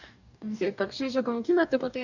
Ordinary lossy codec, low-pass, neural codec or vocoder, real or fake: none; 7.2 kHz; codec, 44.1 kHz, 2.6 kbps, DAC; fake